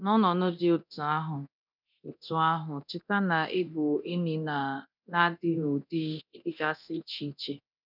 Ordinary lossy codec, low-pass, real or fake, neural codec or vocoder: none; 5.4 kHz; fake; codec, 24 kHz, 0.9 kbps, DualCodec